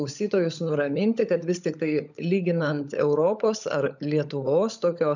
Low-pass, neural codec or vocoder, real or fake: 7.2 kHz; codec, 16 kHz, 16 kbps, FunCodec, trained on LibriTTS, 50 frames a second; fake